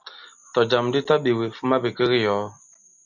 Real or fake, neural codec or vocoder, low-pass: real; none; 7.2 kHz